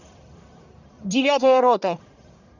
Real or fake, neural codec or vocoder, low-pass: fake; codec, 44.1 kHz, 1.7 kbps, Pupu-Codec; 7.2 kHz